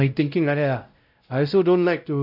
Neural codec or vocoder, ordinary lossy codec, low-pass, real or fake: codec, 16 kHz, 0.5 kbps, X-Codec, WavLM features, trained on Multilingual LibriSpeech; none; 5.4 kHz; fake